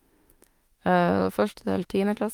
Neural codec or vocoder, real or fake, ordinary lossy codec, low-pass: autoencoder, 48 kHz, 32 numbers a frame, DAC-VAE, trained on Japanese speech; fake; Opus, 32 kbps; 19.8 kHz